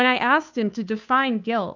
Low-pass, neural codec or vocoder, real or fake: 7.2 kHz; codec, 16 kHz, 2 kbps, FunCodec, trained on Chinese and English, 25 frames a second; fake